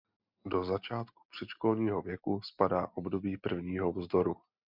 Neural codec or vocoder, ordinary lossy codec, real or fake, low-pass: none; MP3, 48 kbps; real; 5.4 kHz